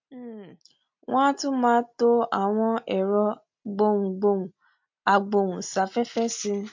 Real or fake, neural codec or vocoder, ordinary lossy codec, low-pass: real; none; MP3, 48 kbps; 7.2 kHz